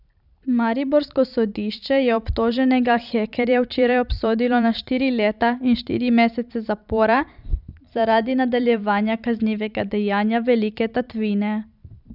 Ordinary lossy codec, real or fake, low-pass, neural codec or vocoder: none; fake; 5.4 kHz; vocoder, 44.1 kHz, 128 mel bands every 512 samples, BigVGAN v2